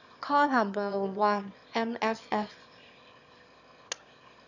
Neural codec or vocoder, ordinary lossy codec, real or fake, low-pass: autoencoder, 22.05 kHz, a latent of 192 numbers a frame, VITS, trained on one speaker; none; fake; 7.2 kHz